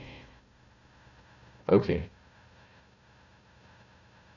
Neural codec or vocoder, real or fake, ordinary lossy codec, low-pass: codec, 16 kHz, 1 kbps, FunCodec, trained on Chinese and English, 50 frames a second; fake; none; 7.2 kHz